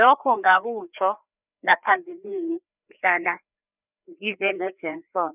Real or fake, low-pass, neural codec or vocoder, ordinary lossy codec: fake; 3.6 kHz; codec, 16 kHz, 2 kbps, FreqCodec, larger model; none